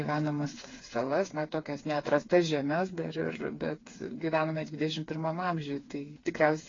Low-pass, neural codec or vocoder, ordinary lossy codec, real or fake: 7.2 kHz; codec, 16 kHz, 4 kbps, FreqCodec, smaller model; AAC, 32 kbps; fake